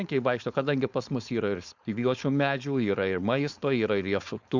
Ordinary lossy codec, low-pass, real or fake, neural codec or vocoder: Opus, 64 kbps; 7.2 kHz; fake; codec, 16 kHz, 4.8 kbps, FACodec